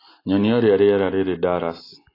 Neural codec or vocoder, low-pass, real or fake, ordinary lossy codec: none; 5.4 kHz; real; AAC, 24 kbps